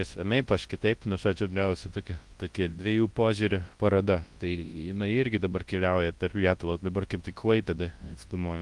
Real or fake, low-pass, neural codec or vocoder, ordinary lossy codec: fake; 10.8 kHz; codec, 24 kHz, 0.9 kbps, WavTokenizer, large speech release; Opus, 24 kbps